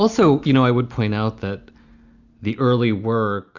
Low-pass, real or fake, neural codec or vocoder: 7.2 kHz; real; none